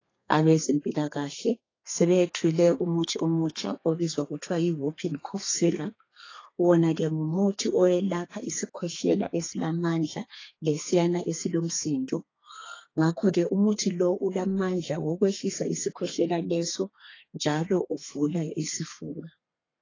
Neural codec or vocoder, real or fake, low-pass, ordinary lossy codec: codec, 32 kHz, 1.9 kbps, SNAC; fake; 7.2 kHz; AAC, 32 kbps